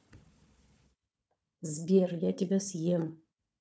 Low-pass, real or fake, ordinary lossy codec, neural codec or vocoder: none; fake; none; codec, 16 kHz, 4 kbps, FunCodec, trained on Chinese and English, 50 frames a second